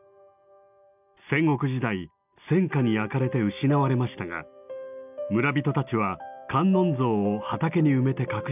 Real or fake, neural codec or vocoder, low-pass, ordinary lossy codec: real; none; 3.6 kHz; none